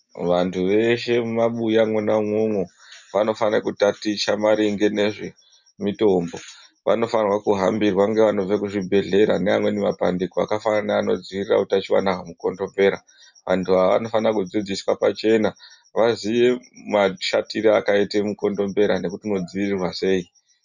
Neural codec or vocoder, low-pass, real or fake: none; 7.2 kHz; real